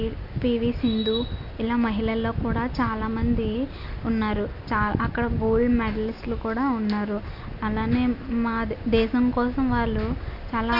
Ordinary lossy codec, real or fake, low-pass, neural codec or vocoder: none; real; 5.4 kHz; none